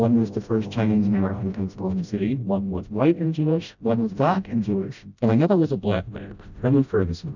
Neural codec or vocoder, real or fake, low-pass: codec, 16 kHz, 0.5 kbps, FreqCodec, smaller model; fake; 7.2 kHz